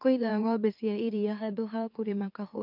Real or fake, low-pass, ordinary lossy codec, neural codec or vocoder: fake; 5.4 kHz; MP3, 48 kbps; autoencoder, 44.1 kHz, a latent of 192 numbers a frame, MeloTTS